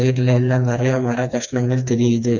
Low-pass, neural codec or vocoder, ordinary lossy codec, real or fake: 7.2 kHz; codec, 16 kHz, 2 kbps, FreqCodec, smaller model; none; fake